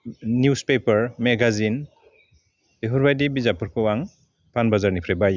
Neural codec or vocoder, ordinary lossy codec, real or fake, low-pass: none; none; real; none